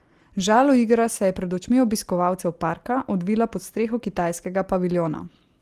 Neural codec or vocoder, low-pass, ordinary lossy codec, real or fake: none; 14.4 kHz; Opus, 24 kbps; real